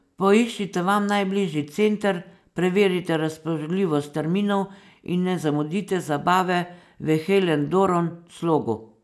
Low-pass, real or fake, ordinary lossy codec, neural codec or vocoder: none; real; none; none